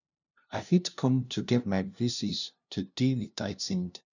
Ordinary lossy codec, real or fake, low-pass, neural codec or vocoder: none; fake; 7.2 kHz; codec, 16 kHz, 0.5 kbps, FunCodec, trained on LibriTTS, 25 frames a second